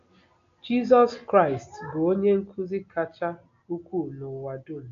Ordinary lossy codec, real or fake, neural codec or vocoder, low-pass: AAC, 48 kbps; real; none; 7.2 kHz